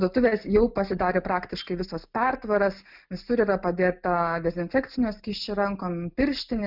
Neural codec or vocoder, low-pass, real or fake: none; 5.4 kHz; real